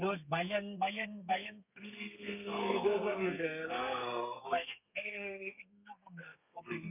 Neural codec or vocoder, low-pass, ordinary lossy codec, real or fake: codec, 32 kHz, 1.9 kbps, SNAC; 3.6 kHz; none; fake